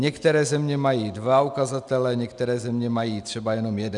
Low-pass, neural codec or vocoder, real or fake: 10.8 kHz; none; real